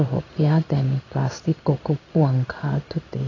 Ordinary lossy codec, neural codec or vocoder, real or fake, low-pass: AAC, 32 kbps; codec, 16 kHz in and 24 kHz out, 1 kbps, XY-Tokenizer; fake; 7.2 kHz